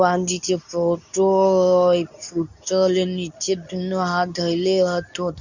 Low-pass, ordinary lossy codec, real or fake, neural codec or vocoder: 7.2 kHz; none; fake; codec, 24 kHz, 0.9 kbps, WavTokenizer, medium speech release version 2